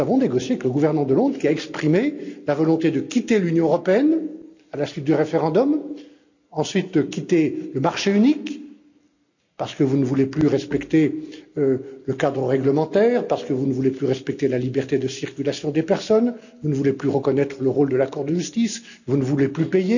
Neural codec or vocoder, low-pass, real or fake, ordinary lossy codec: none; 7.2 kHz; real; none